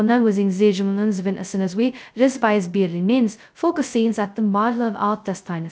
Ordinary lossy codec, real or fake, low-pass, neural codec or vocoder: none; fake; none; codec, 16 kHz, 0.2 kbps, FocalCodec